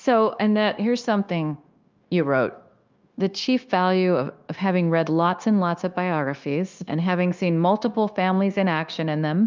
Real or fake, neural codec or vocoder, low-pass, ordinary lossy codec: fake; codec, 16 kHz, 0.9 kbps, LongCat-Audio-Codec; 7.2 kHz; Opus, 24 kbps